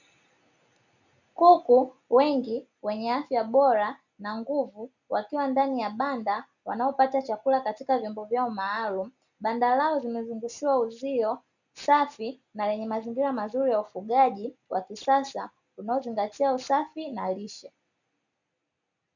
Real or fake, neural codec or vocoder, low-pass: real; none; 7.2 kHz